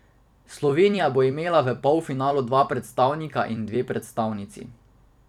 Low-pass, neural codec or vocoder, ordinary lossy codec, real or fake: 19.8 kHz; vocoder, 44.1 kHz, 128 mel bands every 256 samples, BigVGAN v2; none; fake